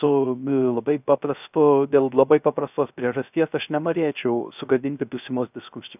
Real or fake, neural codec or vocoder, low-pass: fake; codec, 16 kHz, 0.3 kbps, FocalCodec; 3.6 kHz